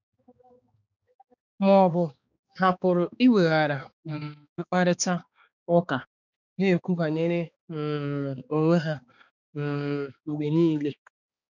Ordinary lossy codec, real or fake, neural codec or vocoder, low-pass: none; fake; codec, 16 kHz, 2 kbps, X-Codec, HuBERT features, trained on balanced general audio; 7.2 kHz